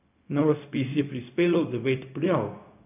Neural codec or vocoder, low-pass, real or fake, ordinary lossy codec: codec, 16 kHz, 0.4 kbps, LongCat-Audio-Codec; 3.6 kHz; fake; none